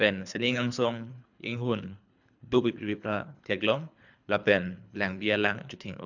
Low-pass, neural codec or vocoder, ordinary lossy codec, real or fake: 7.2 kHz; codec, 24 kHz, 3 kbps, HILCodec; none; fake